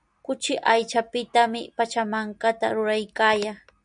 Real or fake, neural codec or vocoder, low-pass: real; none; 9.9 kHz